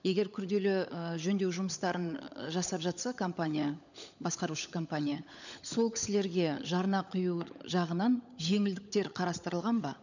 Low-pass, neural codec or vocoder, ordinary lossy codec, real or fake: 7.2 kHz; codec, 16 kHz, 16 kbps, FunCodec, trained on LibriTTS, 50 frames a second; none; fake